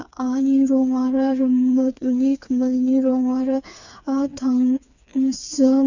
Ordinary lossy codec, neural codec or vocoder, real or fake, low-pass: none; codec, 16 kHz, 4 kbps, FreqCodec, smaller model; fake; 7.2 kHz